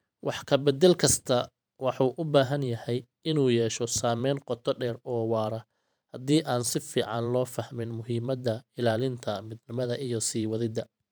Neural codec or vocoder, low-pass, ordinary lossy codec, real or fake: vocoder, 44.1 kHz, 128 mel bands every 512 samples, BigVGAN v2; none; none; fake